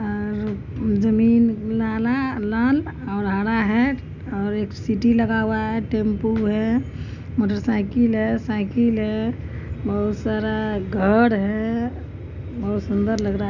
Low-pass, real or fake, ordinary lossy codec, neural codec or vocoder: 7.2 kHz; real; none; none